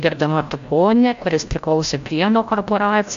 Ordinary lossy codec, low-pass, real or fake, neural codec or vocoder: AAC, 64 kbps; 7.2 kHz; fake; codec, 16 kHz, 0.5 kbps, FreqCodec, larger model